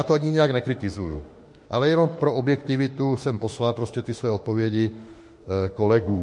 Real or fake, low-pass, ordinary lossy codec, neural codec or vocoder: fake; 10.8 kHz; MP3, 48 kbps; autoencoder, 48 kHz, 32 numbers a frame, DAC-VAE, trained on Japanese speech